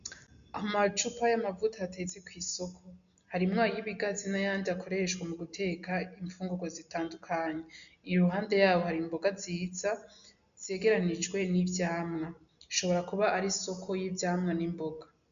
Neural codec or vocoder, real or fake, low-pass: none; real; 7.2 kHz